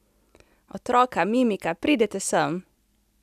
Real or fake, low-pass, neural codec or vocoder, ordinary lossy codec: real; 14.4 kHz; none; none